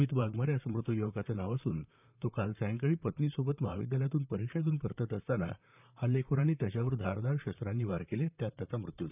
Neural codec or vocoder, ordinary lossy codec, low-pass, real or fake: vocoder, 44.1 kHz, 128 mel bands, Pupu-Vocoder; none; 3.6 kHz; fake